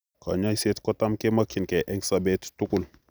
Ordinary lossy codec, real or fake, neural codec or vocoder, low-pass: none; real; none; none